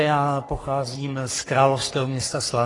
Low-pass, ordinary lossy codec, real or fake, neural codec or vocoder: 10.8 kHz; AAC, 32 kbps; fake; codec, 44.1 kHz, 3.4 kbps, Pupu-Codec